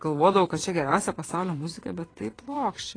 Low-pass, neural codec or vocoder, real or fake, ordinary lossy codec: 9.9 kHz; vocoder, 22.05 kHz, 80 mel bands, WaveNeXt; fake; AAC, 32 kbps